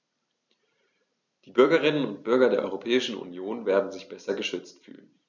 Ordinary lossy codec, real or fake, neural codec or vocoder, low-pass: none; real; none; 7.2 kHz